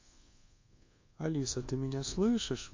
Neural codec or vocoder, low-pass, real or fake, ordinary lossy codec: codec, 24 kHz, 1.2 kbps, DualCodec; 7.2 kHz; fake; MP3, 48 kbps